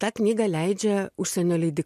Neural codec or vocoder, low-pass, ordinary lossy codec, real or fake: none; 14.4 kHz; MP3, 64 kbps; real